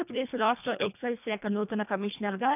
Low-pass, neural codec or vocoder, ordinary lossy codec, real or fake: 3.6 kHz; codec, 24 kHz, 1.5 kbps, HILCodec; none; fake